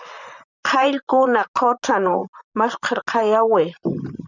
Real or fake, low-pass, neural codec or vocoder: fake; 7.2 kHz; vocoder, 44.1 kHz, 128 mel bands, Pupu-Vocoder